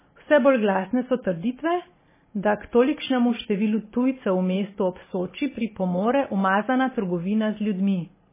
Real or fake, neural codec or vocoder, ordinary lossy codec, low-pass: real; none; MP3, 16 kbps; 3.6 kHz